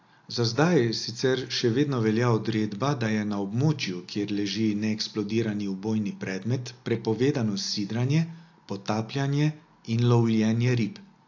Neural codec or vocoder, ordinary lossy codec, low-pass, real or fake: none; AAC, 48 kbps; 7.2 kHz; real